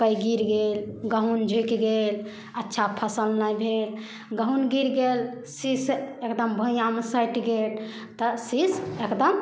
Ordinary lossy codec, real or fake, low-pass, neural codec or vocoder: none; real; none; none